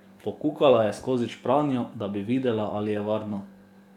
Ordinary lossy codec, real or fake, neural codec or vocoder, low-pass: none; fake; codec, 44.1 kHz, 7.8 kbps, DAC; 19.8 kHz